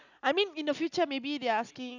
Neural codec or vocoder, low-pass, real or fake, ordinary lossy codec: none; 7.2 kHz; real; none